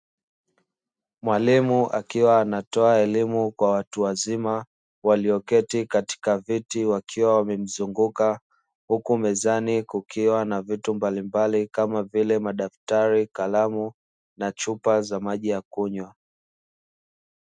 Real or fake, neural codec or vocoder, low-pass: real; none; 9.9 kHz